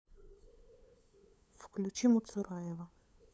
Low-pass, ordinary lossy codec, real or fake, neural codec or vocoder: none; none; fake; codec, 16 kHz, 8 kbps, FunCodec, trained on LibriTTS, 25 frames a second